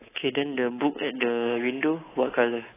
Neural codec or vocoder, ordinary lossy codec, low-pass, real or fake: codec, 24 kHz, 3.1 kbps, DualCodec; AAC, 16 kbps; 3.6 kHz; fake